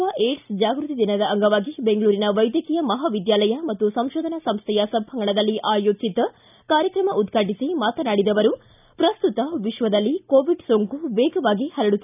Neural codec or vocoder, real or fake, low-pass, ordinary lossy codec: none; real; 3.6 kHz; none